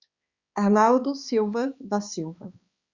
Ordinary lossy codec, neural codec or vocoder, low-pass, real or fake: Opus, 64 kbps; codec, 16 kHz, 2 kbps, X-Codec, HuBERT features, trained on balanced general audio; 7.2 kHz; fake